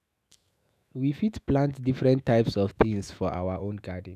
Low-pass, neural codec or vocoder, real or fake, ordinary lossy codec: 14.4 kHz; autoencoder, 48 kHz, 128 numbers a frame, DAC-VAE, trained on Japanese speech; fake; none